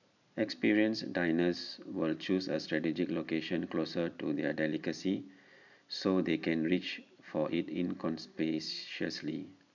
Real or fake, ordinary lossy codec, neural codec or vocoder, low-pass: real; none; none; 7.2 kHz